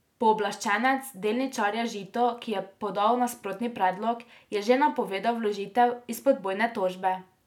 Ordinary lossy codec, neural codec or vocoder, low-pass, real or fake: none; none; 19.8 kHz; real